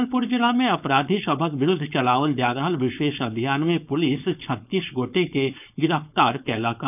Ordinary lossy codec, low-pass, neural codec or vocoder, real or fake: none; 3.6 kHz; codec, 16 kHz, 4.8 kbps, FACodec; fake